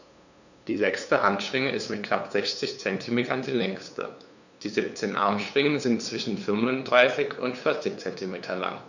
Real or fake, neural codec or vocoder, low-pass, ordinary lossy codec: fake; codec, 16 kHz, 2 kbps, FunCodec, trained on LibriTTS, 25 frames a second; 7.2 kHz; none